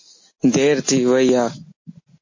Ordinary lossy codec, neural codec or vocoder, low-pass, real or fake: MP3, 32 kbps; none; 7.2 kHz; real